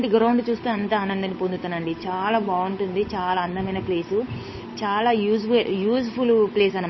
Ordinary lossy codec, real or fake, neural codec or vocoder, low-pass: MP3, 24 kbps; fake; codec, 16 kHz, 8 kbps, FunCodec, trained on Chinese and English, 25 frames a second; 7.2 kHz